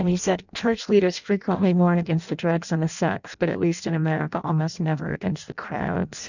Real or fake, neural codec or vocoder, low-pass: fake; codec, 16 kHz in and 24 kHz out, 0.6 kbps, FireRedTTS-2 codec; 7.2 kHz